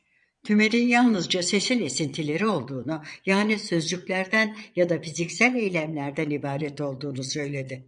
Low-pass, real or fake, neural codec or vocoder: 9.9 kHz; fake; vocoder, 22.05 kHz, 80 mel bands, Vocos